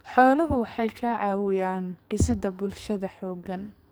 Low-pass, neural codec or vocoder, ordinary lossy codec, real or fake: none; codec, 44.1 kHz, 2.6 kbps, SNAC; none; fake